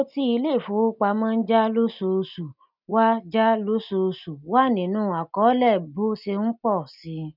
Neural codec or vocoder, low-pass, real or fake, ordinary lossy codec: none; 5.4 kHz; real; none